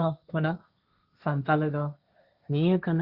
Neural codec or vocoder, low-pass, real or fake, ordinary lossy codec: codec, 16 kHz, 1.1 kbps, Voila-Tokenizer; 5.4 kHz; fake; Opus, 64 kbps